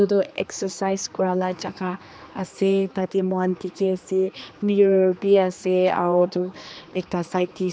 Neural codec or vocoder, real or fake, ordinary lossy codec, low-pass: codec, 16 kHz, 2 kbps, X-Codec, HuBERT features, trained on general audio; fake; none; none